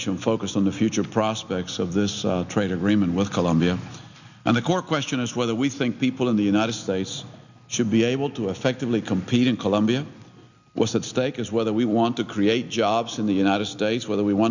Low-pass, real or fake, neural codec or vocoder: 7.2 kHz; real; none